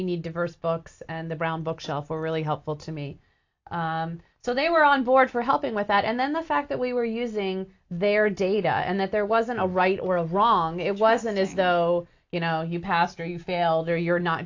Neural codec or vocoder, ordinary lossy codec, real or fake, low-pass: none; AAC, 48 kbps; real; 7.2 kHz